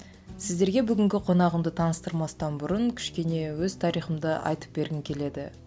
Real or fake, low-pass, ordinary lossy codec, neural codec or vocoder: real; none; none; none